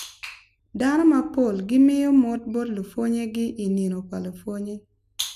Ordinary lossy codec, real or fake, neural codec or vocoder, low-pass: none; real; none; 14.4 kHz